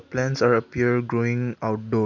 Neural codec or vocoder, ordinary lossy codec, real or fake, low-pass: none; none; real; 7.2 kHz